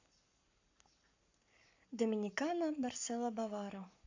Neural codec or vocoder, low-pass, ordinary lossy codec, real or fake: codec, 44.1 kHz, 7.8 kbps, Pupu-Codec; 7.2 kHz; AAC, 48 kbps; fake